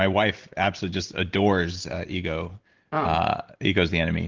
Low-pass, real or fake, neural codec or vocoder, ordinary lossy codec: 7.2 kHz; real; none; Opus, 16 kbps